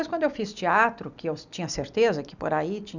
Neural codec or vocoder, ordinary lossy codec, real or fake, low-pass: none; none; real; 7.2 kHz